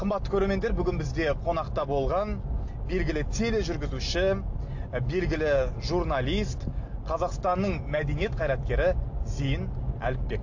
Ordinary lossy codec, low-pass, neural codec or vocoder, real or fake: AAC, 48 kbps; 7.2 kHz; none; real